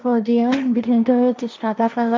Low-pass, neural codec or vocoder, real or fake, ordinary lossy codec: none; codec, 16 kHz, 1.1 kbps, Voila-Tokenizer; fake; none